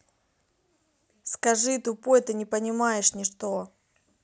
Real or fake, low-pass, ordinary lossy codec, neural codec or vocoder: real; none; none; none